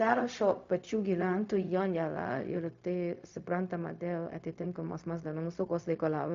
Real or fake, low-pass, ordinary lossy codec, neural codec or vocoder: fake; 7.2 kHz; MP3, 48 kbps; codec, 16 kHz, 0.4 kbps, LongCat-Audio-Codec